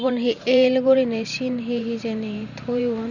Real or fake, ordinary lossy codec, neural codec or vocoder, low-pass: fake; none; vocoder, 44.1 kHz, 128 mel bands every 512 samples, BigVGAN v2; 7.2 kHz